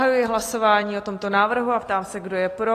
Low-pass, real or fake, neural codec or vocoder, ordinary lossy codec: 14.4 kHz; real; none; AAC, 48 kbps